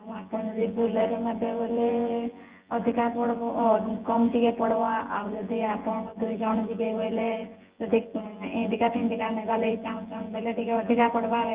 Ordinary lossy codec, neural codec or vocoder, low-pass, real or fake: Opus, 32 kbps; vocoder, 24 kHz, 100 mel bands, Vocos; 3.6 kHz; fake